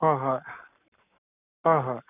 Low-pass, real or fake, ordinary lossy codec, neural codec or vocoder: 3.6 kHz; fake; none; codec, 44.1 kHz, 7.8 kbps, Pupu-Codec